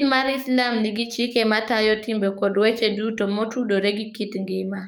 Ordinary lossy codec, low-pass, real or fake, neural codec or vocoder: none; none; fake; codec, 44.1 kHz, 7.8 kbps, DAC